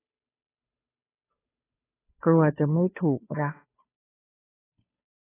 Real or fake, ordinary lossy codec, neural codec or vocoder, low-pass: fake; AAC, 16 kbps; codec, 16 kHz, 2 kbps, FunCodec, trained on Chinese and English, 25 frames a second; 3.6 kHz